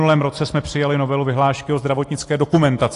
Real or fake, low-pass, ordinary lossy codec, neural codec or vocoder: real; 10.8 kHz; AAC, 48 kbps; none